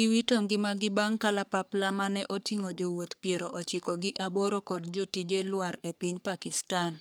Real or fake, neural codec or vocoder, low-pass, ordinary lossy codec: fake; codec, 44.1 kHz, 3.4 kbps, Pupu-Codec; none; none